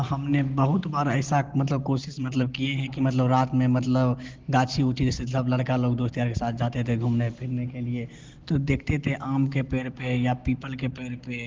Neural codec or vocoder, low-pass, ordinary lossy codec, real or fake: none; 7.2 kHz; Opus, 16 kbps; real